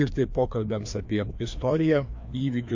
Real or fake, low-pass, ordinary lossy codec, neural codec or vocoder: fake; 7.2 kHz; MP3, 48 kbps; codec, 16 kHz, 2 kbps, FreqCodec, larger model